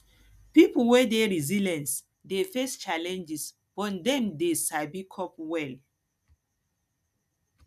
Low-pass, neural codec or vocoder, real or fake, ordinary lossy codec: 14.4 kHz; none; real; none